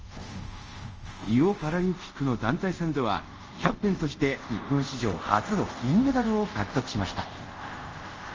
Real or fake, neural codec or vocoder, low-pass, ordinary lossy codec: fake; codec, 24 kHz, 0.5 kbps, DualCodec; 7.2 kHz; Opus, 24 kbps